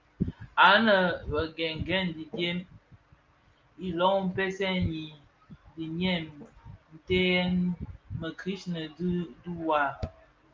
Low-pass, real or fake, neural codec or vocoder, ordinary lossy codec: 7.2 kHz; real; none; Opus, 32 kbps